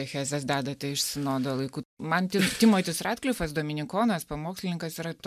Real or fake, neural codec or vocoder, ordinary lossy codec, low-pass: real; none; MP3, 96 kbps; 14.4 kHz